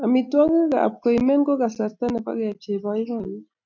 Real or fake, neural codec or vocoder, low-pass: real; none; 7.2 kHz